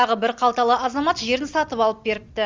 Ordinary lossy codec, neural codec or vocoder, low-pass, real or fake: Opus, 32 kbps; none; 7.2 kHz; real